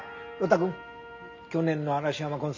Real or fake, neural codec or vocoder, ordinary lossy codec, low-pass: real; none; MP3, 48 kbps; 7.2 kHz